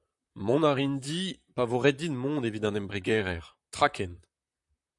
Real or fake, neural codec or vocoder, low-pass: fake; vocoder, 44.1 kHz, 128 mel bands, Pupu-Vocoder; 10.8 kHz